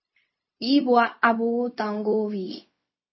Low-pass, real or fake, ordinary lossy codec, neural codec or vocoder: 7.2 kHz; fake; MP3, 24 kbps; codec, 16 kHz, 0.4 kbps, LongCat-Audio-Codec